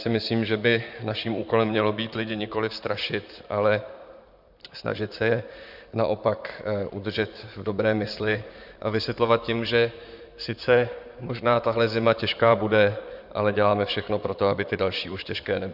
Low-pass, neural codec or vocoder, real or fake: 5.4 kHz; vocoder, 44.1 kHz, 128 mel bands, Pupu-Vocoder; fake